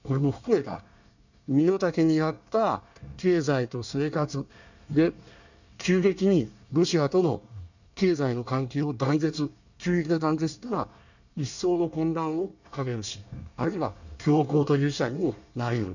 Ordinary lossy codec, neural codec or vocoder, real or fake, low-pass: none; codec, 24 kHz, 1 kbps, SNAC; fake; 7.2 kHz